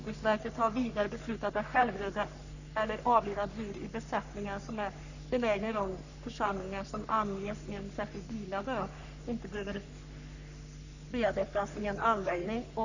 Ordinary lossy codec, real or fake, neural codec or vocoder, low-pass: none; fake; codec, 44.1 kHz, 3.4 kbps, Pupu-Codec; 7.2 kHz